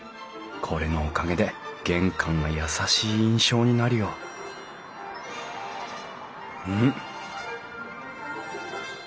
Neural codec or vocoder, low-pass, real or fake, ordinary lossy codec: none; none; real; none